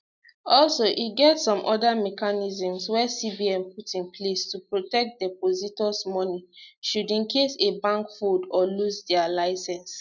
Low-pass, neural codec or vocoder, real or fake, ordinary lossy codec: 7.2 kHz; none; real; none